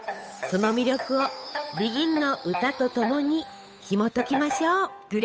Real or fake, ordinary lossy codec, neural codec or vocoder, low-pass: fake; none; codec, 16 kHz, 8 kbps, FunCodec, trained on Chinese and English, 25 frames a second; none